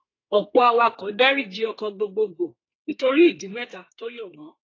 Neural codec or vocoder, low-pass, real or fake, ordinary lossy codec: codec, 32 kHz, 1.9 kbps, SNAC; 7.2 kHz; fake; AAC, 32 kbps